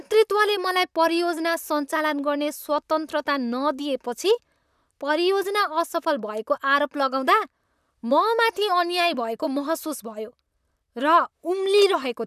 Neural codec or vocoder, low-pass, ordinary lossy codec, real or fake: vocoder, 44.1 kHz, 128 mel bands, Pupu-Vocoder; 14.4 kHz; none; fake